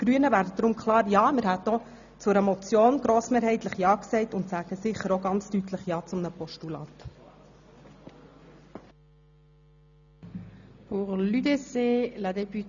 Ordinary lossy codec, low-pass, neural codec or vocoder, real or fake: none; 7.2 kHz; none; real